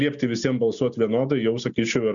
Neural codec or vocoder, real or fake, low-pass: none; real; 7.2 kHz